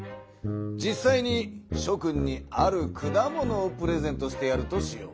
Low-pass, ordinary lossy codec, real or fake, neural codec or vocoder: none; none; real; none